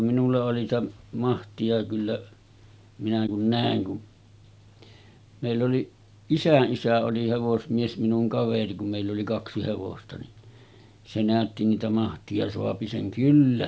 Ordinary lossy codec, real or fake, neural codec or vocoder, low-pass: none; real; none; none